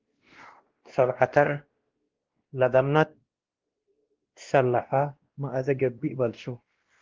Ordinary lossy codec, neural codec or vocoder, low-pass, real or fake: Opus, 16 kbps; codec, 16 kHz, 1 kbps, X-Codec, WavLM features, trained on Multilingual LibriSpeech; 7.2 kHz; fake